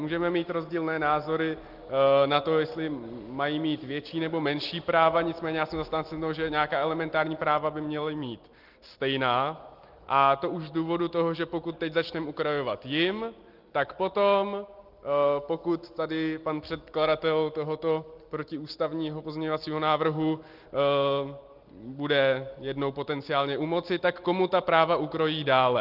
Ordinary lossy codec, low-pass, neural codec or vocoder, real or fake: Opus, 32 kbps; 5.4 kHz; none; real